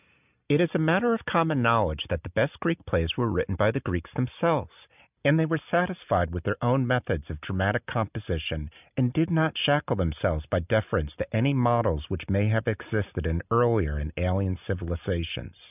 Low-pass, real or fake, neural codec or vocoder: 3.6 kHz; real; none